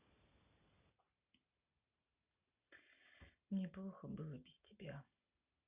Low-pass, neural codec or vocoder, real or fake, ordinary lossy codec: 3.6 kHz; none; real; Opus, 64 kbps